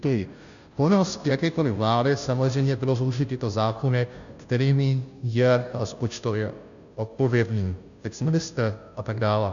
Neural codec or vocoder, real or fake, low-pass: codec, 16 kHz, 0.5 kbps, FunCodec, trained on Chinese and English, 25 frames a second; fake; 7.2 kHz